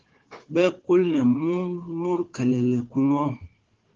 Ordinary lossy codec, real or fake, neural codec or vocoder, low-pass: Opus, 16 kbps; fake; codec, 16 kHz, 4 kbps, FunCodec, trained on Chinese and English, 50 frames a second; 7.2 kHz